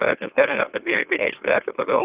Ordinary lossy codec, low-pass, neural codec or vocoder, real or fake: Opus, 24 kbps; 3.6 kHz; autoencoder, 44.1 kHz, a latent of 192 numbers a frame, MeloTTS; fake